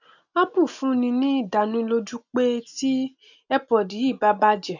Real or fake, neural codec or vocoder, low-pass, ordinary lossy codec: real; none; 7.2 kHz; none